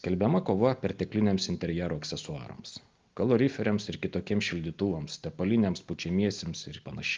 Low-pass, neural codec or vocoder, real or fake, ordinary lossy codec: 7.2 kHz; none; real; Opus, 32 kbps